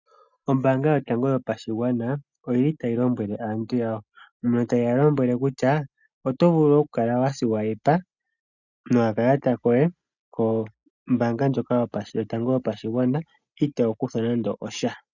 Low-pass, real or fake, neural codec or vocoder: 7.2 kHz; real; none